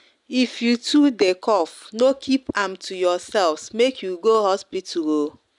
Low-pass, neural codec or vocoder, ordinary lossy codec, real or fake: 10.8 kHz; none; none; real